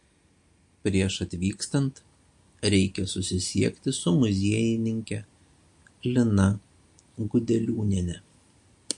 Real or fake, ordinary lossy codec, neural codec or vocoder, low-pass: real; MP3, 48 kbps; none; 10.8 kHz